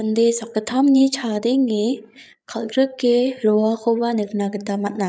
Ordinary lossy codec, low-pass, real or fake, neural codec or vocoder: none; none; fake; codec, 16 kHz, 8 kbps, FreqCodec, larger model